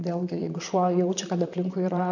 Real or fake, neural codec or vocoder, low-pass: fake; vocoder, 44.1 kHz, 128 mel bands, Pupu-Vocoder; 7.2 kHz